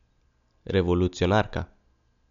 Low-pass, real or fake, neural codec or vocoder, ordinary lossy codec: 7.2 kHz; real; none; none